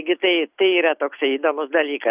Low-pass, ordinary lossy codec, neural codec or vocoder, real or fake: 3.6 kHz; Opus, 64 kbps; none; real